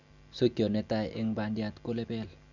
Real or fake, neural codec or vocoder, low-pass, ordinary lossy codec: real; none; 7.2 kHz; none